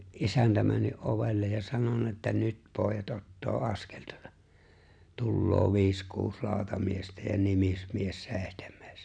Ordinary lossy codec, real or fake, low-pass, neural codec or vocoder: none; real; 9.9 kHz; none